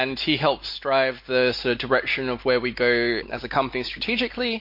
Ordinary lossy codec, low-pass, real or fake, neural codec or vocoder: MP3, 32 kbps; 5.4 kHz; real; none